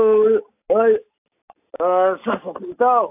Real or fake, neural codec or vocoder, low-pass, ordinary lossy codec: real; none; 3.6 kHz; AAC, 32 kbps